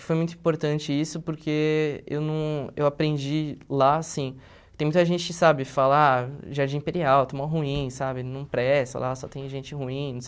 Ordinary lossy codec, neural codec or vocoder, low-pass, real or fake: none; none; none; real